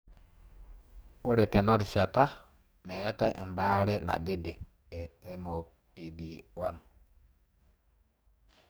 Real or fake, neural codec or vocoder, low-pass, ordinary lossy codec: fake; codec, 44.1 kHz, 2.6 kbps, DAC; none; none